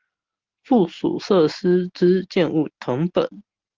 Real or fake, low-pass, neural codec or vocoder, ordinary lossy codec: real; 7.2 kHz; none; Opus, 24 kbps